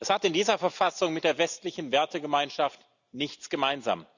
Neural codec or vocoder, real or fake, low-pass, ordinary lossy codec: none; real; 7.2 kHz; none